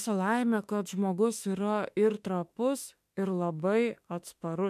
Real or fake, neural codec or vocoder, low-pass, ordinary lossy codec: fake; autoencoder, 48 kHz, 32 numbers a frame, DAC-VAE, trained on Japanese speech; 14.4 kHz; MP3, 96 kbps